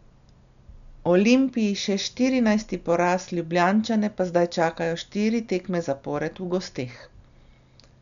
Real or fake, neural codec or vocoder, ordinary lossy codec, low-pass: real; none; none; 7.2 kHz